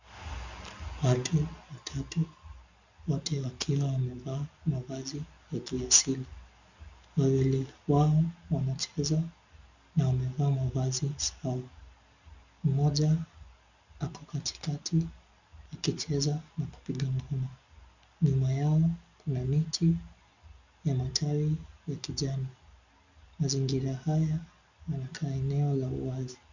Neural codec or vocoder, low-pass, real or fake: none; 7.2 kHz; real